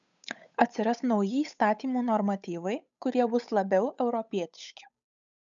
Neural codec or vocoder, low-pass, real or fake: codec, 16 kHz, 8 kbps, FunCodec, trained on Chinese and English, 25 frames a second; 7.2 kHz; fake